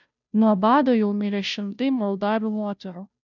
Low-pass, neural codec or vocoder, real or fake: 7.2 kHz; codec, 16 kHz, 0.5 kbps, FunCodec, trained on Chinese and English, 25 frames a second; fake